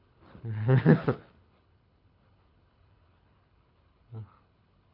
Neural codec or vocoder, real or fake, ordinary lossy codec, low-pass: codec, 24 kHz, 6 kbps, HILCodec; fake; AAC, 48 kbps; 5.4 kHz